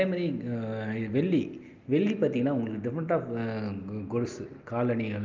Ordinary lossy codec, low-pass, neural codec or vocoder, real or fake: Opus, 24 kbps; 7.2 kHz; none; real